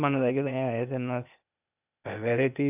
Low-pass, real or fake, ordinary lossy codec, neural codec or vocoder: 3.6 kHz; fake; none; codec, 16 kHz, 0.8 kbps, ZipCodec